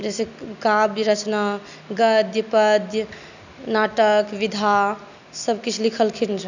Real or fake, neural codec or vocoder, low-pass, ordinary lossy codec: real; none; 7.2 kHz; none